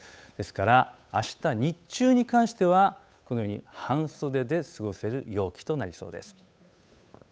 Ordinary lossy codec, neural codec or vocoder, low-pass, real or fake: none; codec, 16 kHz, 8 kbps, FunCodec, trained on Chinese and English, 25 frames a second; none; fake